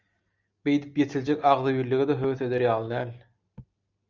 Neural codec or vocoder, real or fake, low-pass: none; real; 7.2 kHz